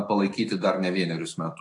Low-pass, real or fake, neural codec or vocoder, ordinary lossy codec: 10.8 kHz; fake; autoencoder, 48 kHz, 128 numbers a frame, DAC-VAE, trained on Japanese speech; AAC, 48 kbps